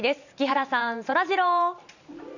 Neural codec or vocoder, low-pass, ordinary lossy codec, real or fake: vocoder, 44.1 kHz, 128 mel bands every 512 samples, BigVGAN v2; 7.2 kHz; none; fake